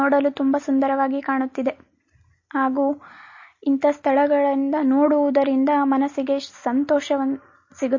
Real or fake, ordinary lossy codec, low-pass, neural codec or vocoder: real; MP3, 32 kbps; 7.2 kHz; none